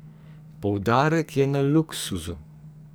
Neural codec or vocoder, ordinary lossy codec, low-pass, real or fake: codec, 44.1 kHz, 2.6 kbps, SNAC; none; none; fake